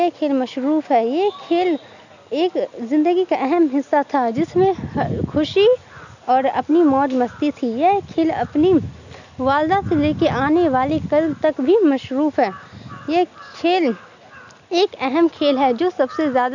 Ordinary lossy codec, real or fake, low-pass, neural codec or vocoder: none; real; 7.2 kHz; none